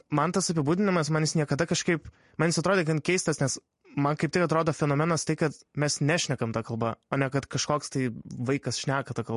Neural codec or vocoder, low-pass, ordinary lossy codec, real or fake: none; 14.4 kHz; MP3, 48 kbps; real